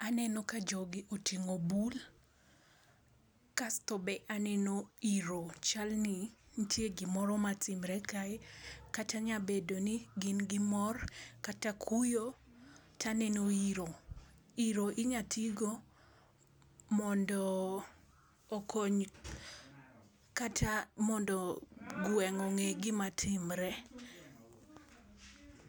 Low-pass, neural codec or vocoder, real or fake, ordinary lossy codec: none; none; real; none